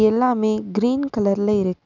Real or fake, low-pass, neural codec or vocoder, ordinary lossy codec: real; 7.2 kHz; none; none